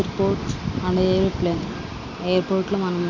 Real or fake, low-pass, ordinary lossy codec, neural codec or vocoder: real; 7.2 kHz; AAC, 48 kbps; none